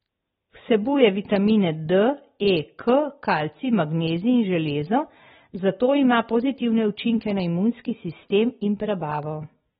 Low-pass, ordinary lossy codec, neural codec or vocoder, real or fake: 9.9 kHz; AAC, 16 kbps; none; real